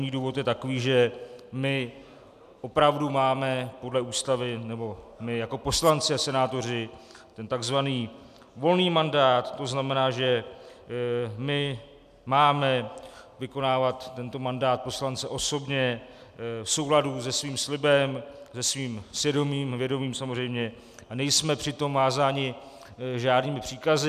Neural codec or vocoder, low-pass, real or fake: none; 14.4 kHz; real